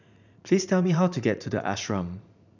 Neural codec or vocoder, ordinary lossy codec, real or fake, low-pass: vocoder, 44.1 kHz, 80 mel bands, Vocos; none; fake; 7.2 kHz